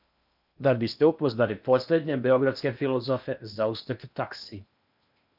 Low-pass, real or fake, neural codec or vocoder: 5.4 kHz; fake; codec, 16 kHz in and 24 kHz out, 0.8 kbps, FocalCodec, streaming, 65536 codes